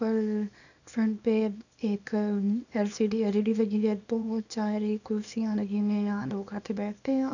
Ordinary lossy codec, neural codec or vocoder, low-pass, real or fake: none; codec, 24 kHz, 0.9 kbps, WavTokenizer, small release; 7.2 kHz; fake